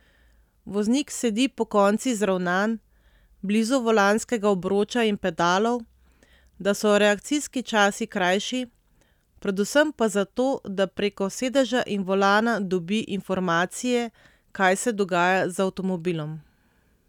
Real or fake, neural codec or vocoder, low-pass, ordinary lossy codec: real; none; 19.8 kHz; none